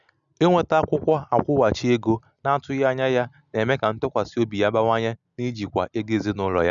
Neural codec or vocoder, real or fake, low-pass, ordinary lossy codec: none; real; 7.2 kHz; none